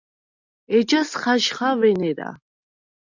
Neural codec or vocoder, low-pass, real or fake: none; 7.2 kHz; real